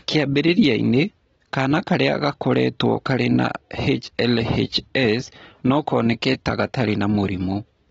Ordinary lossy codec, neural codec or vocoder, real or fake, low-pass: AAC, 24 kbps; none; real; 19.8 kHz